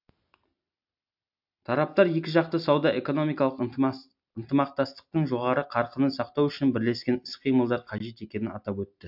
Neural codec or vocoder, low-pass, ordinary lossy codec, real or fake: none; 5.4 kHz; AAC, 48 kbps; real